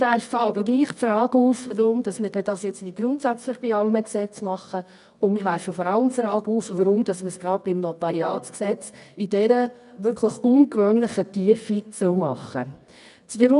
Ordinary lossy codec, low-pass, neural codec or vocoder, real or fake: none; 10.8 kHz; codec, 24 kHz, 0.9 kbps, WavTokenizer, medium music audio release; fake